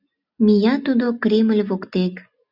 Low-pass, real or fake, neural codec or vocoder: 5.4 kHz; real; none